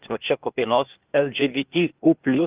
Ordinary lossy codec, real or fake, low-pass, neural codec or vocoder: Opus, 32 kbps; fake; 3.6 kHz; codec, 16 kHz, 0.8 kbps, ZipCodec